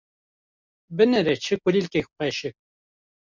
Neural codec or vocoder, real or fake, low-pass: none; real; 7.2 kHz